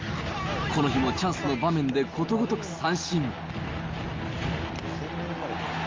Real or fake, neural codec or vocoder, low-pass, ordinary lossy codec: real; none; 7.2 kHz; Opus, 32 kbps